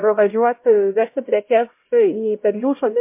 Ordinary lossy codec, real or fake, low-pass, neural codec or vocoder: MP3, 24 kbps; fake; 3.6 kHz; codec, 16 kHz, 0.5 kbps, X-Codec, HuBERT features, trained on LibriSpeech